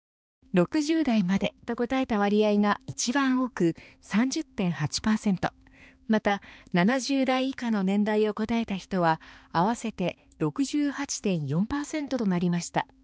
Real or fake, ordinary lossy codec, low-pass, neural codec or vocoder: fake; none; none; codec, 16 kHz, 2 kbps, X-Codec, HuBERT features, trained on balanced general audio